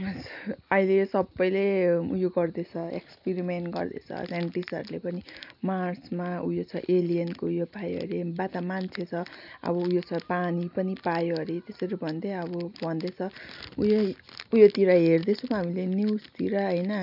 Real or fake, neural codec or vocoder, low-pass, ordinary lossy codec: real; none; 5.4 kHz; none